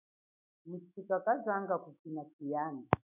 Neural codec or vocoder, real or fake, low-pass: autoencoder, 48 kHz, 128 numbers a frame, DAC-VAE, trained on Japanese speech; fake; 3.6 kHz